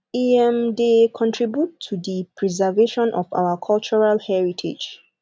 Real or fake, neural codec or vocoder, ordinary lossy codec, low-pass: real; none; none; none